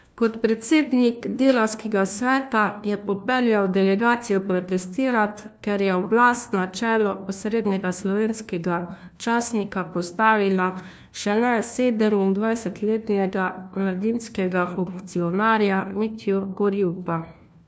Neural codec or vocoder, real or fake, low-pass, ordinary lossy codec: codec, 16 kHz, 1 kbps, FunCodec, trained on LibriTTS, 50 frames a second; fake; none; none